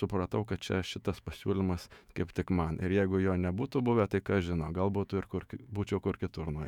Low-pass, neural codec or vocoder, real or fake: 19.8 kHz; autoencoder, 48 kHz, 128 numbers a frame, DAC-VAE, trained on Japanese speech; fake